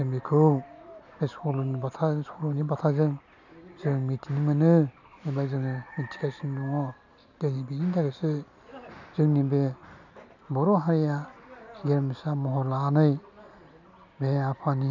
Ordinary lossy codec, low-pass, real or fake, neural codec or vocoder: none; 7.2 kHz; real; none